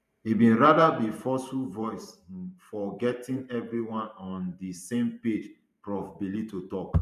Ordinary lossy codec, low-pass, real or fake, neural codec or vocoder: MP3, 96 kbps; 14.4 kHz; fake; vocoder, 44.1 kHz, 128 mel bands every 512 samples, BigVGAN v2